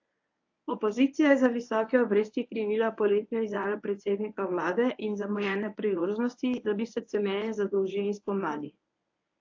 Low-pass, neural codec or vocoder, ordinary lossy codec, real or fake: 7.2 kHz; codec, 24 kHz, 0.9 kbps, WavTokenizer, medium speech release version 1; AAC, 48 kbps; fake